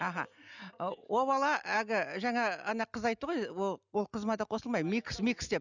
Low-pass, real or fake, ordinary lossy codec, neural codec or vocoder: 7.2 kHz; real; none; none